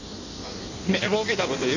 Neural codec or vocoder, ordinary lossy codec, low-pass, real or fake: codec, 16 kHz in and 24 kHz out, 1.1 kbps, FireRedTTS-2 codec; none; 7.2 kHz; fake